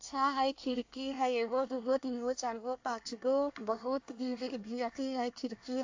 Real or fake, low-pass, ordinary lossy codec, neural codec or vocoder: fake; 7.2 kHz; AAC, 48 kbps; codec, 24 kHz, 1 kbps, SNAC